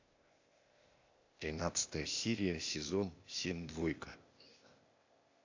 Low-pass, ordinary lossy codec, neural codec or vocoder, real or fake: 7.2 kHz; AAC, 48 kbps; codec, 16 kHz, 0.8 kbps, ZipCodec; fake